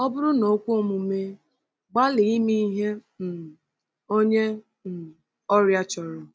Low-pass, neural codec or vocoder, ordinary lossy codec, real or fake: none; none; none; real